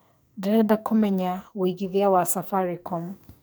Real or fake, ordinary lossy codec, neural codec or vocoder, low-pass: fake; none; codec, 44.1 kHz, 2.6 kbps, SNAC; none